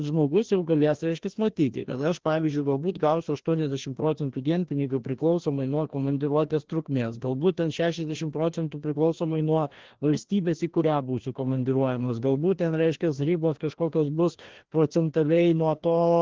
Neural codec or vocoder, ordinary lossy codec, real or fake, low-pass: codec, 16 kHz, 1 kbps, FreqCodec, larger model; Opus, 16 kbps; fake; 7.2 kHz